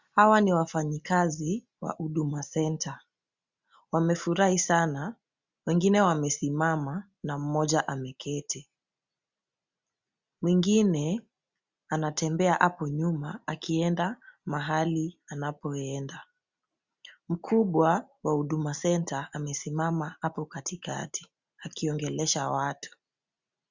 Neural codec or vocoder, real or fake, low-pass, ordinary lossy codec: none; real; 7.2 kHz; Opus, 64 kbps